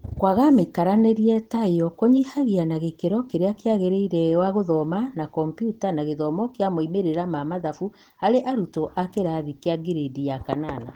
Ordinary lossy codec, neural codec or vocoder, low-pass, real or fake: Opus, 16 kbps; none; 19.8 kHz; real